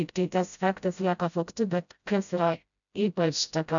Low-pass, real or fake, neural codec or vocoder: 7.2 kHz; fake; codec, 16 kHz, 0.5 kbps, FreqCodec, smaller model